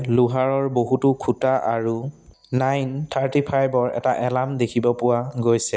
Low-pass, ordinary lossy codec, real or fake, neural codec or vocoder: none; none; real; none